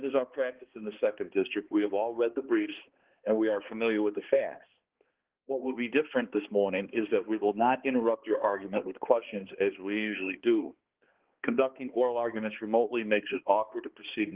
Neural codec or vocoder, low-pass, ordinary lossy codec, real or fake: codec, 16 kHz, 2 kbps, X-Codec, HuBERT features, trained on balanced general audio; 3.6 kHz; Opus, 16 kbps; fake